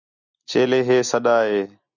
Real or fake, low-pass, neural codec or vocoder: real; 7.2 kHz; none